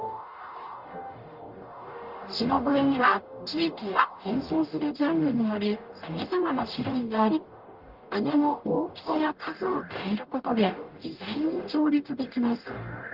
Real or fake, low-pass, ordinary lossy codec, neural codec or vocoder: fake; 5.4 kHz; Opus, 32 kbps; codec, 44.1 kHz, 0.9 kbps, DAC